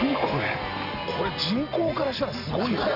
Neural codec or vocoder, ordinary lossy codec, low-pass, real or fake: none; none; 5.4 kHz; real